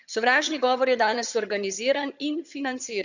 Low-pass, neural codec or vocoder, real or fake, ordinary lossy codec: 7.2 kHz; vocoder, 22.05 kHz, 80 mel bands, HiFi-GAN; fake; none